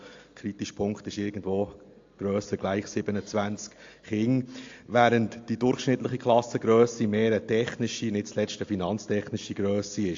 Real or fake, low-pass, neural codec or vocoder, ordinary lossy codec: real; 7.2 kHz; none; AAC, 48 kbps